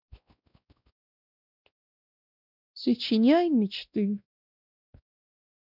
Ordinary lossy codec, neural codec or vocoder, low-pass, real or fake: none; codec, 16 kHz, 1 kbps, X-Codec, WavLM features, trained on Multilingual LibriSpeech; 5.4 kHz; fake